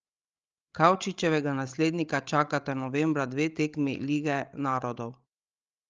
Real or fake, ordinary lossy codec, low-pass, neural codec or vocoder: fake; Opus, 24 kbps; 7.2 kHz; codec, 16 kHz, 16 kbps, FreqCodec, larger model